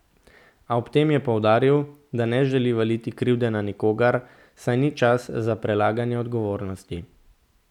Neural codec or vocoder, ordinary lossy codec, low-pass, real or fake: none; none; 19.8 kHz; real